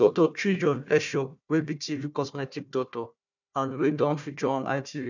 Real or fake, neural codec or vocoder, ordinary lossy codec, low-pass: fake; codec, 16 kHz, 1 kbps, FunCodec, trained on Chinese and English, 50 frames a second; none; 7.2 kHz